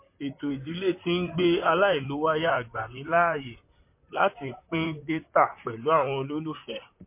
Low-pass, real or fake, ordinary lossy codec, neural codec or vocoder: 3.6 kHz; fake; MP3, 24 kbps; vocoder, 44.1 kHz, 128 mel bands, Pupu-Vocoder